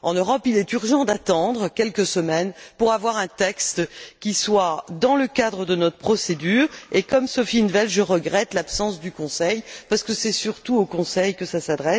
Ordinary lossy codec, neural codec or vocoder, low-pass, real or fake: none; none; none; real